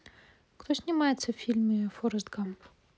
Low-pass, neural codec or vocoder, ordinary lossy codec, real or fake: none; none; none; real